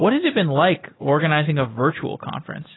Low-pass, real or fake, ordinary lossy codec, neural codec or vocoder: 7.2 kHz; real; AAC, 16 kbps; none